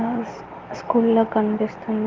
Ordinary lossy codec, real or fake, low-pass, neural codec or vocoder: Opus, 32 kbps; real; 7.2 kHz; none